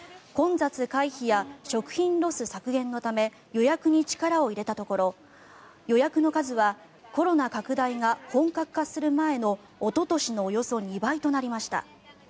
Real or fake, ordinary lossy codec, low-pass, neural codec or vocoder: real; none; none; none